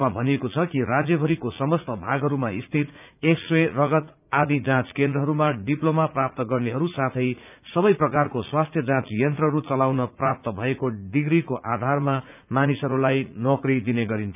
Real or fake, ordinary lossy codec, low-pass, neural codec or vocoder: fake; none; 3.6 kHz; vocoder, 44.1 kHz, 80 mel bands, Vocos